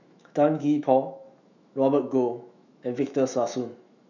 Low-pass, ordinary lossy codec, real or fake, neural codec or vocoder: 7.2 kHz; none; fake; autoencoder, 48 kHz, 128 numbers a frame, DAC-VAE, trained on Japanese speech